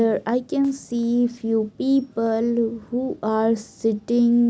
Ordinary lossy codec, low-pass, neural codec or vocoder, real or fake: none; none; none; real